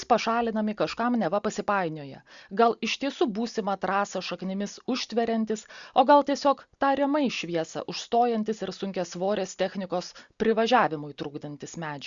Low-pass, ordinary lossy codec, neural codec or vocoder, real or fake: 7.2 kHz; Opus, 64 kbps; none; real